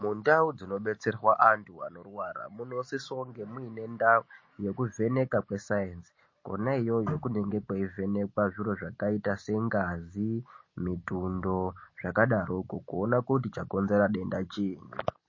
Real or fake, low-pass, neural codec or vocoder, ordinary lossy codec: real; 7.2 kHz; none; MP3, 32 kbps